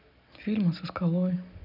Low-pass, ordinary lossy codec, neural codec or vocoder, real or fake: 5.4 kHz; none; none; real